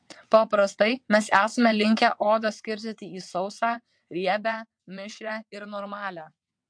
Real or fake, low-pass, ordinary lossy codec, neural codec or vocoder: fake; 9.9 kHz; MP3, 64 kbps; vocoder, 22.05 kHz, 80 mel bands, WaveNeXt